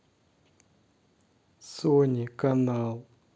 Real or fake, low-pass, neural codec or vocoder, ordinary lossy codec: real; none; none; none